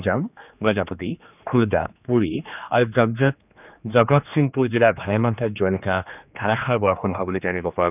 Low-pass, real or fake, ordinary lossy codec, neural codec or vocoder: 3.6 kHz; fake; none; codec, 16 kHz, 2 kbps, X-Codec, HuBERT features, trained on general audio